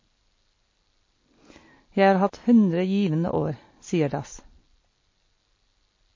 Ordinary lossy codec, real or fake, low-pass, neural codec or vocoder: MP3, 32 kbps; real; 7.2 kHz; none